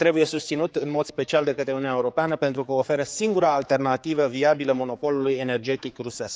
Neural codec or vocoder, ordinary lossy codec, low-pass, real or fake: codec, 16 kHz, 4 kbps, X-Codec, HuBERT features, trained on general audio; none; none; fake